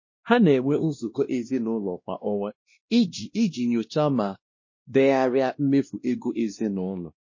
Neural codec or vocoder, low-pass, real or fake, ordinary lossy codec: codec, 16 kHz, 1 kbps, X-Codec, WavLM features, trained on Multilingual LibriSpeech; 7.2 kHz; fake; MP3, 32 kbps